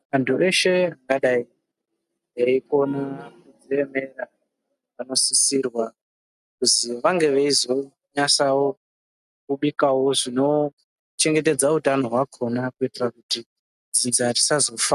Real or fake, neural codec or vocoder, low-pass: real; none; 14.4 kHz